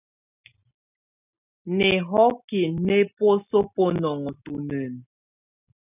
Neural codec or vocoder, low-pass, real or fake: none; 3.6 kHz; real